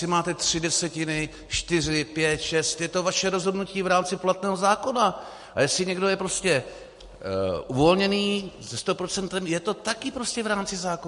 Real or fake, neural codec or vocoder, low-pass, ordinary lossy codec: real; none; 14.4 kHz; MP3, 48 kbps